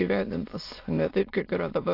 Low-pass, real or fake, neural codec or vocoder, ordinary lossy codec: 5.4 kHz; fake; autoencoder, 22.05 kHz, a latent of 192 numbers a frame, VITS, trained on many speakers; AAC, 32 kbps